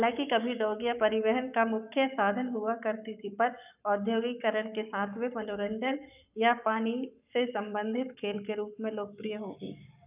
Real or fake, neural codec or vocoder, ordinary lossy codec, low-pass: fake; codec, 44.1 kHz, 7.8 kbps, Pupu-Codec; none; 3.6 kHz